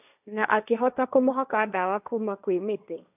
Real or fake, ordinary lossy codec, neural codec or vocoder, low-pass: fake; none; codec, 16 kHz, 1.1 kbps, Voila-Tokenizer; 3.6 kHz